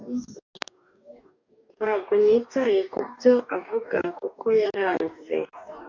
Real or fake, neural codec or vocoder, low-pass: fake; codec, 44.1 kHz, 2.6 kbps, DAC; 7.2 kHz